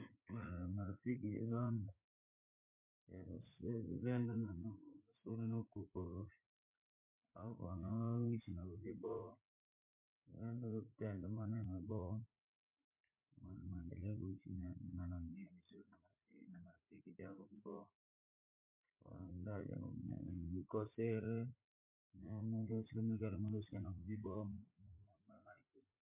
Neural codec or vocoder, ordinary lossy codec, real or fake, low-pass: vocoder, 44.1 kHz, 80 mel bands, Vocos; MP3, 32 kbps; fake; 3.6 kHz